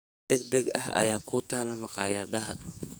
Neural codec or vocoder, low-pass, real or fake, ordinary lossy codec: codec, 44.1 kHz, 2.6 kbps, SNAC; none; fake; none